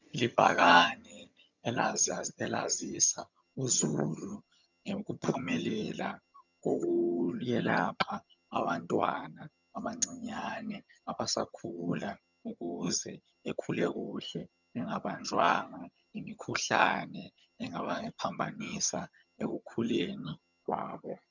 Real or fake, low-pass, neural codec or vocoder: fake; 7.2 kHz; vocoder, 22.05 kHz, 80 mel bands, HiFi-GAN